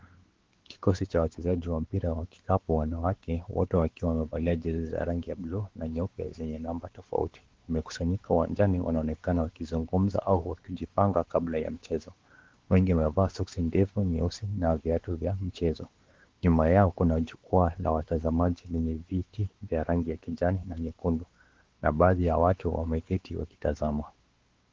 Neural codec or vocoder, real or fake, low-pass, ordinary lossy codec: codec, 16 kHz, 4 kbps, X-Codec, WavLM features, trained on Multilingual LibriSpeech; fake; 7.2 kHz; Opus, 16 kbps